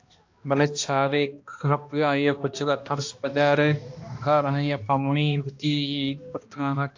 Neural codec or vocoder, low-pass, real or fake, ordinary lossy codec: codec, 16 kHz, 1 kbps, X-Codec, HuBERT features, trained on balanced general audio; 7.2 kHz; fake; AAC, 48 kbps